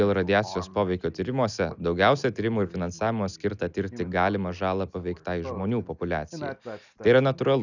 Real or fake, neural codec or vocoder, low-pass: real; none; 7.2 kHz